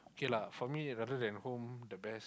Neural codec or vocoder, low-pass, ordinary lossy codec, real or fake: none; none; none; real